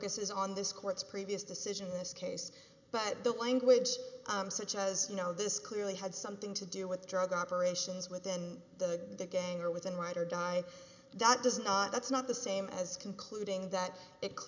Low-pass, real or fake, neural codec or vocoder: 7.2 kHz; real; none